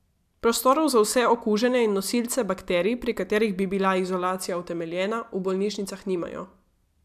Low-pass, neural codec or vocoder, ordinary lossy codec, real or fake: 14.4 kHz; none; MP3, 96 kbps; real